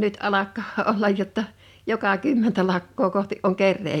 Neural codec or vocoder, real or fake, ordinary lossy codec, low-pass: none; real; none; 19.8 kHz